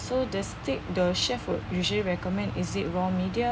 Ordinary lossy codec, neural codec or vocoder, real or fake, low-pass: none; none; real; none